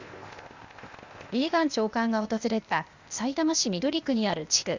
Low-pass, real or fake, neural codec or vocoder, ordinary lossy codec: 7.2 kHz; fake; codec, 16 kHz, 0.8 kbps, ZipCodec; Opus, 64 kbps